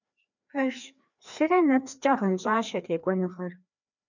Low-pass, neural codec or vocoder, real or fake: 7.2 kHz; codec, 16 kHz, 2 kbps, FreqCodec, larger model; fake